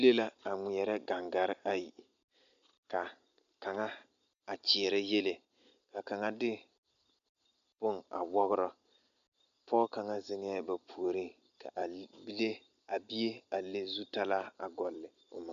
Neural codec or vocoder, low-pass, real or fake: none; 7.2 kHz; real